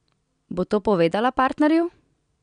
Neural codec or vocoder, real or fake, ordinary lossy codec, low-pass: none; real; none; 9.9 kHz